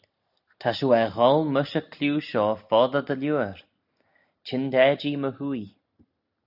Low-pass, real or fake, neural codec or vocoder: 5.4 kHz; real; none